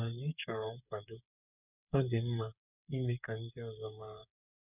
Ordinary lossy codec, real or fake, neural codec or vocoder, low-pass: none; fake; codec, 16 kHz, 8 kbps, FreqCodec, smaller model; 3.6 kHz